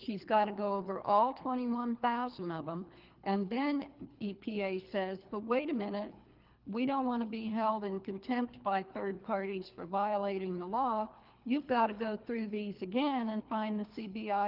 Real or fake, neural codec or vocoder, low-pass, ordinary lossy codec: fake; codec, 24 kHz, 3 kbps, HILCodec; 5.4 kHz; Opus, 32 kbps